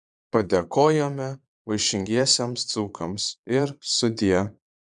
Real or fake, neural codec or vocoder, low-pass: fake; vocoder, 22.05 kHz, 80 mel bands, Vocos; 9.9 kHz